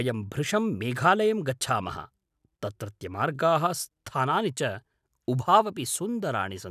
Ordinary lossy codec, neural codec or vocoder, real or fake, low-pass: none; none; real; 14.4 kHz